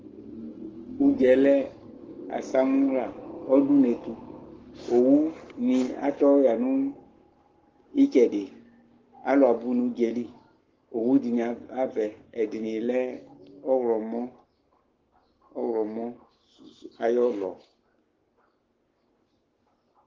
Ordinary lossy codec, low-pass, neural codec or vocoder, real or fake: Opus, 16 kbps; 7.2 kHz; codec, 44.1 kHz, 7.8 kbps, Pupu-Codec; fake